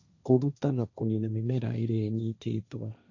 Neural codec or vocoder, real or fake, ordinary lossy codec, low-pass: codec, 16 kHz, 1.1 kbps, Voila-Tokenizer; fake; AAC, 48 kbps; 7.2 kHz